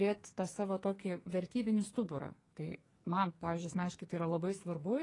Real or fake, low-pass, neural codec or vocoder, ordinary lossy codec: fake; 10.8 kHz; codec, 44.1 kHz, 2.6 kbps, SNAC; AAC, 32 kbps